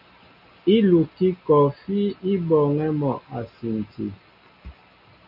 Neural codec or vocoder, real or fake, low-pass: none; real; 5.4 kHz